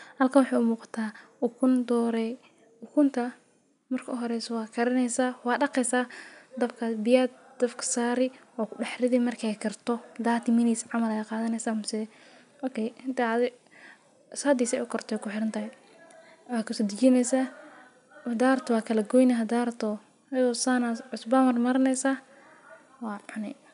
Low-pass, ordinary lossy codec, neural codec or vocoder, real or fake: 10.8 kHz; none; none; real